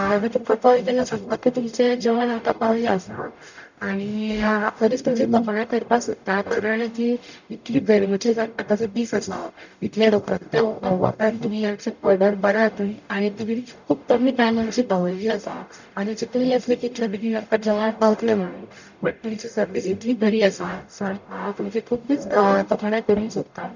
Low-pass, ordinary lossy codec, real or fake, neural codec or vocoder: 7.2 kHz; none; fake; codec, 44.1 kHz, 0.9 kbps, DAC